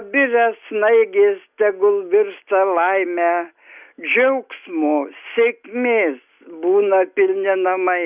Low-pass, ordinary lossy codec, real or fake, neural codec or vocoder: 3.6 kHz; Opus, 64 kbps; real; none